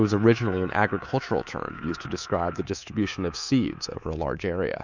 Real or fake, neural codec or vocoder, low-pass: fake; codec, 24 kHz, 3.1 kbps, DualCodec; 7.2 kHz